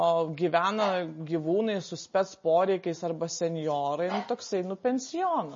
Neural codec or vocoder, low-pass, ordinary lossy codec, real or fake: none; 7.2 kHz; MP3, 32 kbps; real